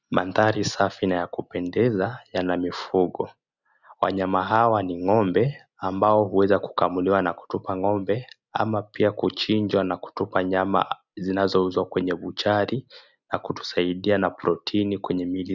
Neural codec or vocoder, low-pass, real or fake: none; 7.2 kHz; real